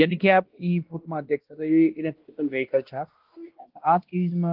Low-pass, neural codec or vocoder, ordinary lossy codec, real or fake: 5.4 kHz; codec, 16 kHz, 1 kbps, X-Codec, WavLM features, trained on Multilingual LibriSpeech; Opus, 16 kbps; fake